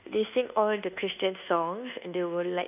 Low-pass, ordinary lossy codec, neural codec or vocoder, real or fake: 3.6 kHz; none; codec, 24 kHz, 1.2 kbps, DualCodec; fake